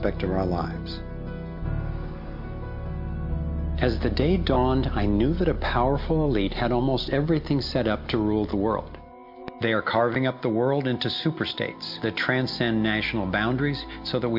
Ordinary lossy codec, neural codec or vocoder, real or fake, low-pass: MP3, 48 kbps; none; real; 5.4 kHz